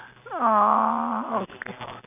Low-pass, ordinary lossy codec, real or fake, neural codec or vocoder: 3.6 kHz; none; real; none